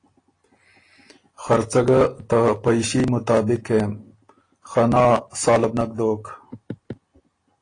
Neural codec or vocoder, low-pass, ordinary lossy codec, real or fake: none; 9.9 kHz; AAC, 32 kbps; real